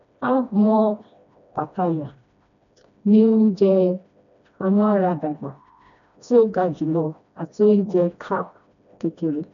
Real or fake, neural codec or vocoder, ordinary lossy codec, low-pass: fake; codec, 16 kHz, 1 kbps, FreqCodec, smaller model; none; 7.2 kHz